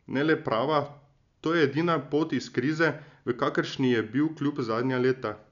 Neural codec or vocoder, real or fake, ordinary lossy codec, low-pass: none; real; none; 7.2 kHz